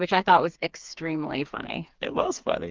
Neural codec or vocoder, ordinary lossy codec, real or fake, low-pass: codec, 16 kHz, 4 kbps, FreqCodec, smaller model; Opus, 32 kbps; fake; 7.2 kHz